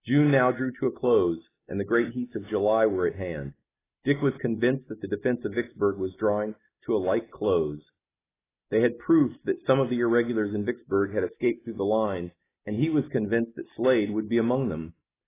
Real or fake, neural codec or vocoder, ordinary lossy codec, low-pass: real; none; AAC, 16 kbps; 3.6 kHz